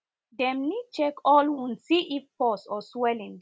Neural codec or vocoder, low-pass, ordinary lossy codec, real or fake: none; none; none; real